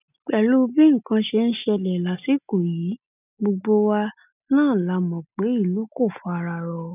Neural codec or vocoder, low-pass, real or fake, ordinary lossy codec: none; 3.6 kHz; real; none